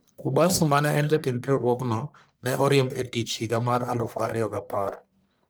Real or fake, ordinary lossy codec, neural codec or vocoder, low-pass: fake; none; codec, 44.1 kHz, 1.7 kbps, Pupu-Codec; none